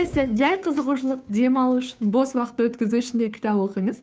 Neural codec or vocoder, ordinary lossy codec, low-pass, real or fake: codec, 16 kHz, 2 kbps, FunCodec, trained on Chinese and English, 25 frames a second; none; none; fake